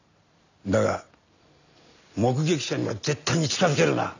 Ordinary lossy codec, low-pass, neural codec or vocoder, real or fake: none; 7.2 kHz; none; real